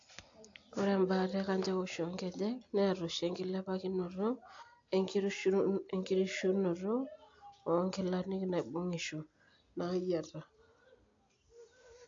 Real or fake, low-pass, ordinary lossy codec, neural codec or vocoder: real; 7.2 kHz; AAC, 48 kbps; none